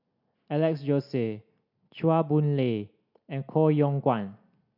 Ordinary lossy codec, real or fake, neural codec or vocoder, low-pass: none; real; none; 5.4 kHz